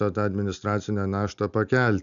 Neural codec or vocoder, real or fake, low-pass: none; real; 7.2 kHz